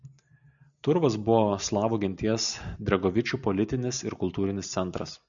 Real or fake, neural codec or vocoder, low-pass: real; none; 7.2 kHz